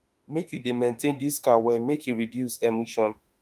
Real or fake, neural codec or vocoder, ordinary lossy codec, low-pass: fake; autoencoder, 48 kHz, 32 numbers a frame, DAC-VAE, trained on Japanese speech; Opus, 32 kbps; 14.4 kHz